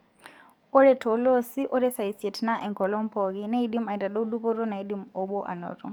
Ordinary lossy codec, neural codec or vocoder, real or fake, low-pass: none; codec, 44.1 kHz, 7.8 kbps, DAC; fake; none